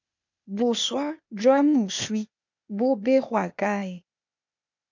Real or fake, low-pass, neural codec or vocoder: fake; 7.2 kHz; codec, 16 kHz, 0.8 kbps, ZipCodec